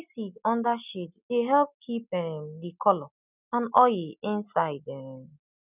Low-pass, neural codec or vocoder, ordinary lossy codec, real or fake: 3.6 kHz; none; none; real